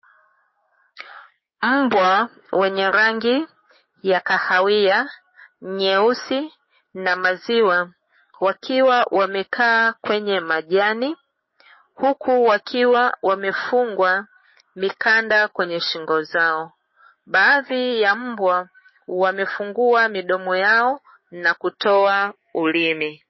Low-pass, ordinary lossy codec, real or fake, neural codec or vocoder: 7.2 kHz; MP3, 24 kbps; fake; codec, 16 kHz, 6 kbps, DAC